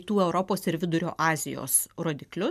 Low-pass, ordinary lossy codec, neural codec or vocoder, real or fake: 14.4 kHz; MP3, 96 kbps; none; real